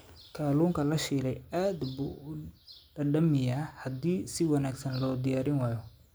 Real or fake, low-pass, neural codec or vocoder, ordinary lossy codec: real; none; none; none